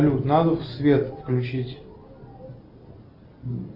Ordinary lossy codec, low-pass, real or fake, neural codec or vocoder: Opus, 64 kbps; 5.4 kHz; real; none